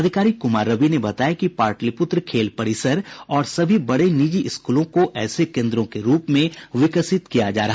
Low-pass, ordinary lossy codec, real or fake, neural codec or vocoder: none; none; real; none